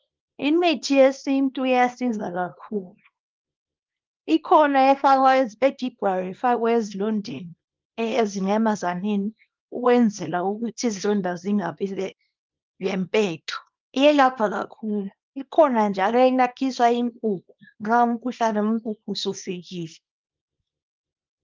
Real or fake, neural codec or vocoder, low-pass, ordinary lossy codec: fake; codec, 24 kHz, 0.9 kbps, WavTokenizer, small release; 7.2 kHz; Opus, 32 kbps